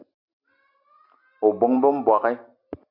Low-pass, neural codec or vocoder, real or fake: 5.4 kHz; none; real